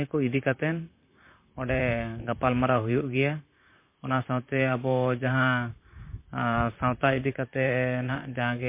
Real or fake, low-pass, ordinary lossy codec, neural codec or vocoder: fake; 3.6 kHz; MP3, 24 kbps; vocoder, 44.1 kHz, 128 mel bands, Pupu-Vocoder